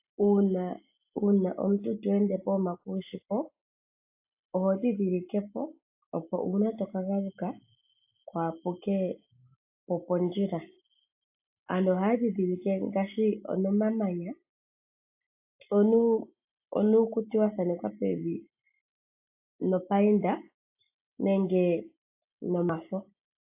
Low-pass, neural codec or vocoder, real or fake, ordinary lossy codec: 3.6 kHz; none; real; AAC, 32 kbps